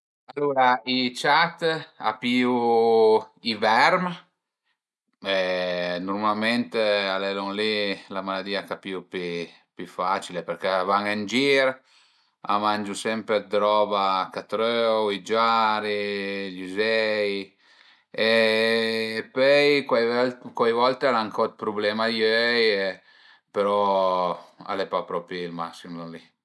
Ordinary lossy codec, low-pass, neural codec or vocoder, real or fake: none; none; none; real